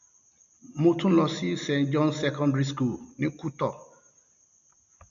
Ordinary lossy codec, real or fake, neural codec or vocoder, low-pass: MP3, 64 kbps; real; none; 7.2 kHz